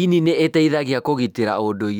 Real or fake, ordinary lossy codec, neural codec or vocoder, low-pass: fake; none; autoencoder, 48 kHz, 128 numbers a frame, DAC-VAE, trained on Japanese speech; 19.8 kHz